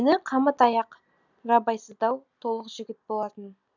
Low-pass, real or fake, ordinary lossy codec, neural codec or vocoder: 7.2 kHz; real; none; none